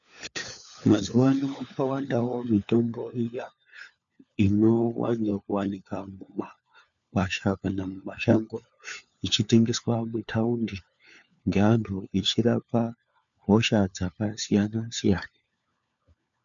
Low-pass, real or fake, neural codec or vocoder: 7.2 kHz; fake; codec, 16 kHz, 4 kbps, FunCodec, trained on LibriTTS, 50 frames a second